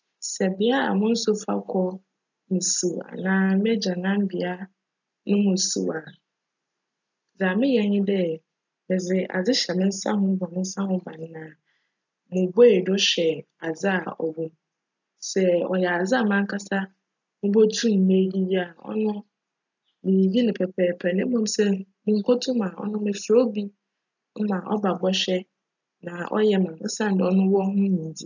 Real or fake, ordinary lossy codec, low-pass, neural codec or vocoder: real; none; 7.2 kHz; none